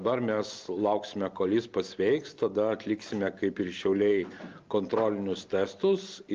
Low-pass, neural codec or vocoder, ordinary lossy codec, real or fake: 7.2 kHz; none; Opus, 24 kbps; real